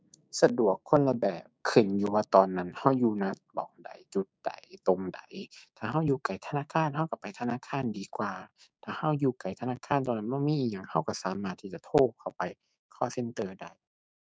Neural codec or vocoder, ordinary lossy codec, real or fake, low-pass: codec, 16 kHz, 6 kbps, DAC; none; fake; none